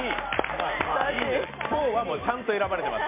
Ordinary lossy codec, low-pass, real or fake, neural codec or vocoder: MP3, 32 kbps; 3.6 kHz; real; none